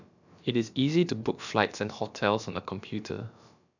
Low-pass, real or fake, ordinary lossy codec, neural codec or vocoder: 7.2 kHz; fake; none; codec, 16 kHz, about 1 kbps, DyCAST, with the encoder's durations